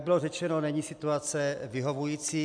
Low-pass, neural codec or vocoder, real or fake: 9.9 kHz; none; real